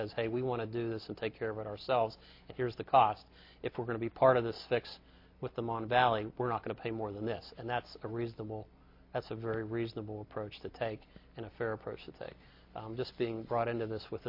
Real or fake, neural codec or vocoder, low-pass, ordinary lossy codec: real; none; 5.4 kHz; MP3, 32 kbps